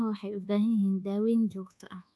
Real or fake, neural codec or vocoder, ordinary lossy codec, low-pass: fake; codec, 24 kHz, 1.2 kbps, DualCodec; none; none